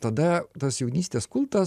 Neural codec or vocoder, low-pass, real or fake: vocoder, 44.1 kHz, 128 mel bands every 512 samples, BigVGAN v2; 14.4 kHz; fake